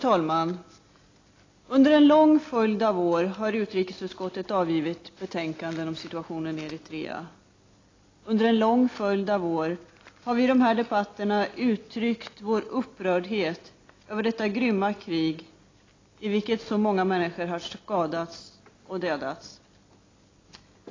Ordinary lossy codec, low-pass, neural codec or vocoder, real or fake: AAC, 32 kbps; 7.2 kHz; none; real